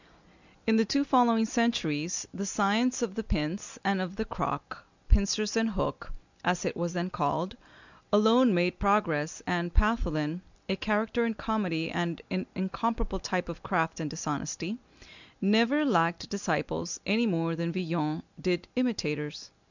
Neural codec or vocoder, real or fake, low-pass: none; real; 7.2 kHz